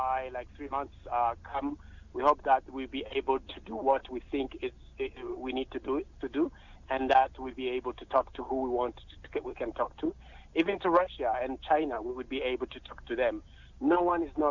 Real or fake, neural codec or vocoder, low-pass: real; none; 7.2 kHz